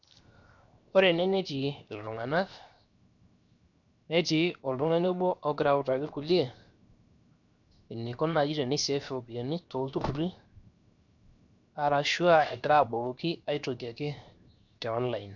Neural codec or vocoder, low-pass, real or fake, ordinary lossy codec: codec, 16 kHz, 0.7 kbps, FocalCodec; 7.2 kHz; fake; none